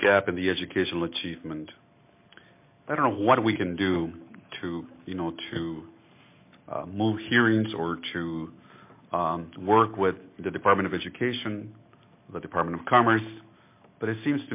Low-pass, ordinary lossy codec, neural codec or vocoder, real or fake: 3.6 kHz; MP3, 24 kbps; none; real